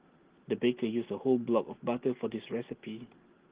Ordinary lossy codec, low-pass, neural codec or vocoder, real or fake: Opus, 16 kbps; 3.6 kHz; none; real